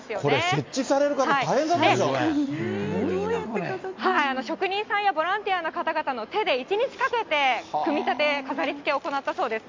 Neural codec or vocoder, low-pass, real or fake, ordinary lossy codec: none; 7.2 kHz; real; MP3, 48 kbps